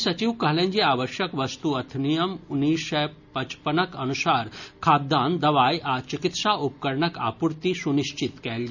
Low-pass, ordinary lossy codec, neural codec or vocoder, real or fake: 7.2 kHz; none; none; real